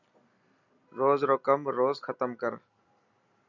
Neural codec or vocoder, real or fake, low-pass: none; real; 7.2 kHz